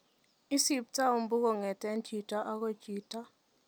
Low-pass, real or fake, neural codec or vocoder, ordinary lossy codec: none; real; none; none